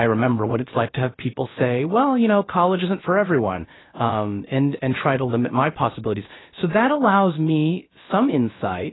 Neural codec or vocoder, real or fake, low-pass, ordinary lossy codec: codec, 16 kHz, about 1 kbps, DyCAST, with the encoder's durations; fake; 7.2 kHz; AAC, 16 kbps